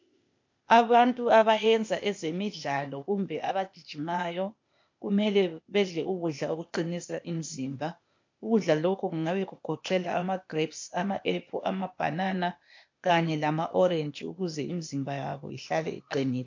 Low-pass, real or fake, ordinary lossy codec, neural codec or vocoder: 7.2 kHz; fake; MP3, 48 kbps; codec, 16 kHz, 0.8 kbps, ZipCodec